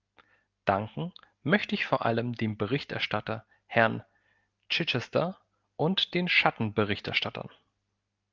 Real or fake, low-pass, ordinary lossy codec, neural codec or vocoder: real; 7.2 kHz; Opus, 24 kbps; none